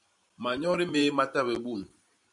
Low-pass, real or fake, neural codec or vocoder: 10.8 kHz; fake; vocoder, 44.1 kHz, 128 mel bands every 512 samples, BigVGAN v2